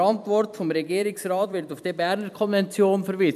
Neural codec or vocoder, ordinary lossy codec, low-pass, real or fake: vocoder, 44.1 kHz, 128 mel bands every 256 samples, BigVGAN v2; MP3, 96 kbps; 14.4 kHz; fake